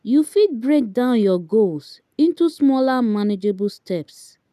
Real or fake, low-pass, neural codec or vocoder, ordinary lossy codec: real; 14.4 kHz; none; none